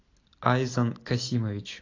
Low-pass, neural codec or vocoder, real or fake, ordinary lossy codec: 7.2 kHz; none; real; AAC, 32 kbps